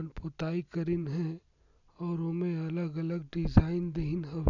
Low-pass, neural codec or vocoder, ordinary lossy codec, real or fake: 7.2 kHz; none; none; real